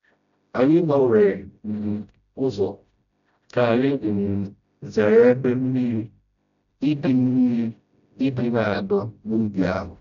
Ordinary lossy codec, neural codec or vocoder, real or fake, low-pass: MP3, 96 kbps; codec, 16 kHz, 0.5 kbps, FreqCodec, smaller model; fake; 7.2 kHz